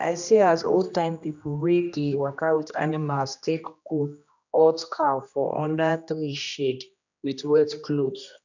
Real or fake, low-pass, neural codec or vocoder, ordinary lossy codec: fake; 7.2 kHz; codec, 16 kHz, 1 kbps, X-Codec, HuBERT features, trained on general audio; none